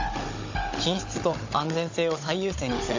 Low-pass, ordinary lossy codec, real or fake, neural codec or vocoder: 7.2 kHz; AAC, 48 kbps; fake; codec, 16 kHz, 16 kbps, FunCodec, trained on Chinese and English, 50 frames a second